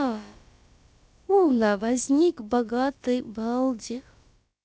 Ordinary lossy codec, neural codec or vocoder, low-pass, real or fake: none; codec, 16 kHz, about 1 kbps, DyCAST, with the encoder's durations; none; fake